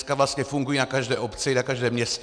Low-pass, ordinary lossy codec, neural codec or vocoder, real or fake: 9.9 kHz; MP3, 96 kbps; none; real